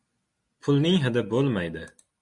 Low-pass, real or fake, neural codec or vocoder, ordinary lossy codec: 10.8 kHz; real; none; MP3, 64 kbps